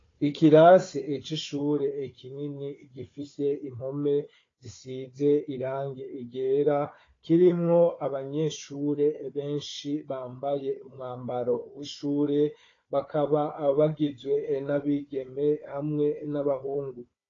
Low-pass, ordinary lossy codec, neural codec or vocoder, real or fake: 7.2 kHz; AAC, 32 kbps; codec, 16 kHz, 4 kbps, FunCodec, trained on Chinese and English, 50 frames a second; fake